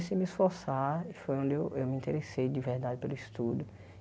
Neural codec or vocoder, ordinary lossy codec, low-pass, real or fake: none; none; none; real